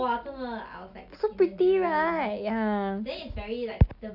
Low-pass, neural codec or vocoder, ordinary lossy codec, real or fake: 5.4 kHz; none; none; real